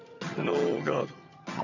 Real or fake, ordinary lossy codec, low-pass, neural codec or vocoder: fake; none; 7.2 kHz; vocoder, 22.05 kHz, 80 mel bands, HiFi-GAN